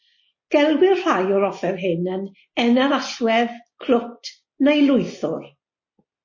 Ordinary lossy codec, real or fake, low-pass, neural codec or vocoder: MP3, 32 kbps; real; 7.2 kHz; none